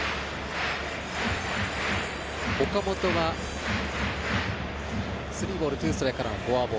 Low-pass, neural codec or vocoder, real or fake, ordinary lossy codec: none; none; real; none